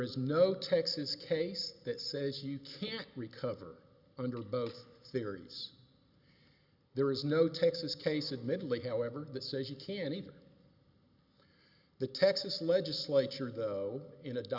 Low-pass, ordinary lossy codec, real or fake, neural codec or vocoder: 5.4 kHz; Opus, 64 kbps; real; none